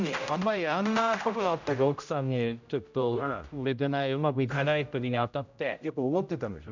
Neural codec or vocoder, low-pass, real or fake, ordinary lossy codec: codec, 16 kHz, 0.5 kbps, X-Codec, HuBERT features, trained on general audio; 7.2 kHz; fake; none